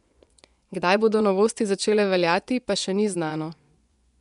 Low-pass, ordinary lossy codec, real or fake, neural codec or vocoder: 10.8 kHz; none; fake; vocoder, 24 kHz, 100 mel bands, Vocos